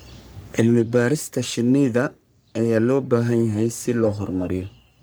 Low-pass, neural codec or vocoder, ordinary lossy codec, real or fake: none; codec, 44.1 kHz, 3.4 kbps, Pupu-Codec; none; fake